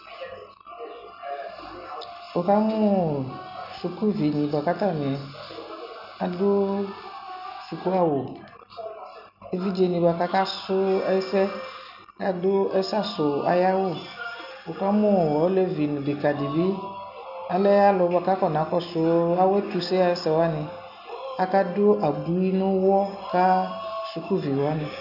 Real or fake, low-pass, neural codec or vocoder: real; 5.4 kHz; none